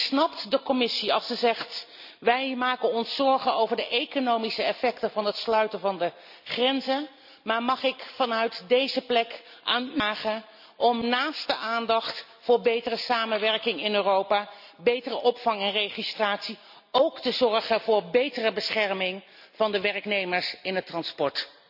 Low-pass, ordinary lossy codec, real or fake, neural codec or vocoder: 5.4 kHz; none; real; none